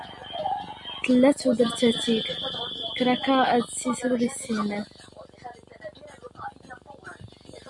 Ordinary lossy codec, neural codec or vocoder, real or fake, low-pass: Opus, 64 kbps; none; real; 10.8 kHz